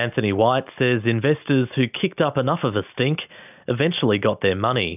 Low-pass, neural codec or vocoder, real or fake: 3.6 kHz; none; real